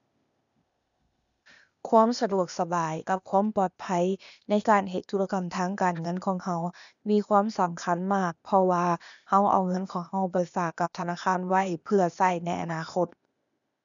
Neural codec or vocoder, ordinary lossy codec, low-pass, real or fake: codec, 16 kHz, 0.8 kbps, ZipCodec; none; 7.2 kHz; fake